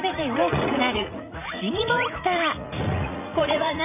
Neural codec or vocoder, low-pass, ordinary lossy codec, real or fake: vocoder, 44.1 kHz, 80 mel bands, Vocos; 3.6 kHz; none; fake